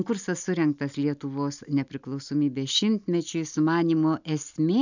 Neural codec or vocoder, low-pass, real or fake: none; 7.2 kHz; real